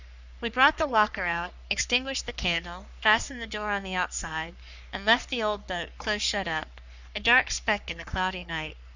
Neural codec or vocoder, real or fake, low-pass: codec, 44.1 kHz, 3.4 kbps, Pupu-Codec; fake; 7.2 kHz